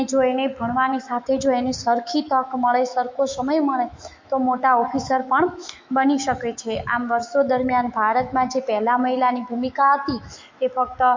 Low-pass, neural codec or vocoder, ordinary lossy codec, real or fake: 7.2 kHz; autoencoder, 48 kHz, 128 numbers a frame, DAC-VAE, trained on Japanese speech; MP3, 48 kbps; fake